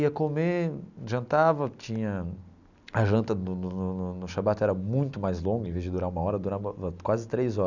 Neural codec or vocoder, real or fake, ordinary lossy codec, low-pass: none; real; none; 7.2 kHz